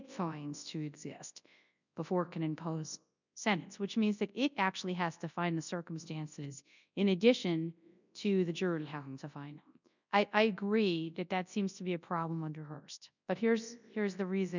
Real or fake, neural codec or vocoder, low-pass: fake; codec, 24 kHz, 0.9 kbps, WavTokenizer, large speech release; 7.2 kHz